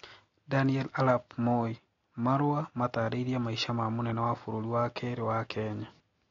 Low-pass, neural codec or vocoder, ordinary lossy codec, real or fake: 7.2 kHz; none; AAC, 32 kbps; real